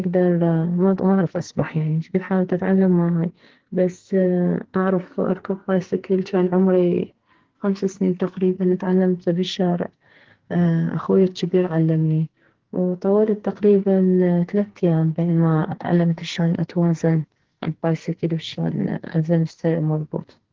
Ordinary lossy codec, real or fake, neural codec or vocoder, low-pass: Opus, 16 kbps; fake; codec, 44.1 kHz, 2.6 kbps, SNAC; 7.2 kHz